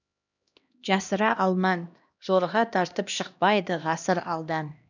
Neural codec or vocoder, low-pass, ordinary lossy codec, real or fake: codec, 16 kHz, 1 kbps, X-Codec, HuBERT features, trained on LibriSpeech; 7.2 kHz; none; fake